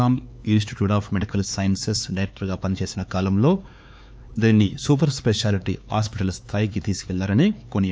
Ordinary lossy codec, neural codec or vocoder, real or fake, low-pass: none; codec, 16 kHz, 4 kbps, X-Codec, HuBERT features, trained on LibriSpeech; fake; none